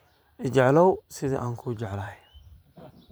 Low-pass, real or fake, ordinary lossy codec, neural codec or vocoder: none; fake; none; vocoder, 44.1 kHz, 128 mel bands every 512 samples, BigVGAN v2